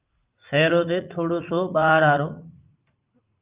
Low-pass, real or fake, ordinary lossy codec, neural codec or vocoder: 3.6 kHz; fake; Opus, 24 kbps; vocoder, 44.1 kHz, 80 mel bands, Vocos